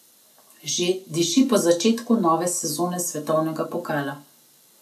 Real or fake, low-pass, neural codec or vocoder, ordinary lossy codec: real; 14.4 kHz; none; none